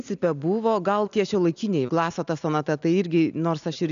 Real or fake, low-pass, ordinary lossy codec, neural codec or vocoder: real; 7.2 kHz; MP3, 96 kbps; none